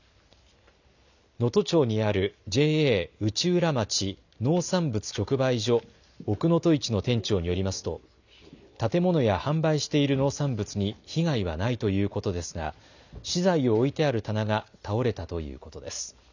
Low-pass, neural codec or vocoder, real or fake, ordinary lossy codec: 7.2 kHz; none; real; none